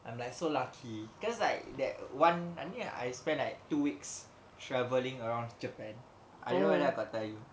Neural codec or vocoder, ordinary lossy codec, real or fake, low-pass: none; none; real; none